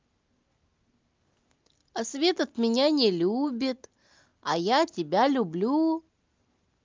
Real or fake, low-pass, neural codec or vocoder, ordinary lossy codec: real; 7.2 kHz; none; Opus, 24 kbps